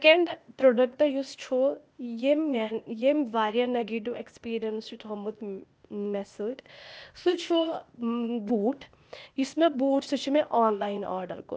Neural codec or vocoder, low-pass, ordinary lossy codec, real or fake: codec, 16 kHz, 0.8 kbps, ZipCodec; none; none; fake